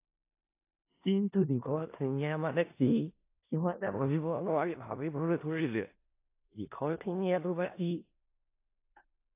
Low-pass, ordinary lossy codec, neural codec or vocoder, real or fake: 3.6 kHz; AAC, 24 kbps; codec, 16 kHz in and 24 kHz out, 0.4 kbps, LongCat-Audio-Codec, four codebook decoder; fake